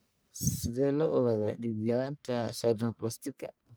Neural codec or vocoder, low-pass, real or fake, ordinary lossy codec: codec, 44.1 kHz, 1.7 kbps, Pupu-Codec; none; fake; none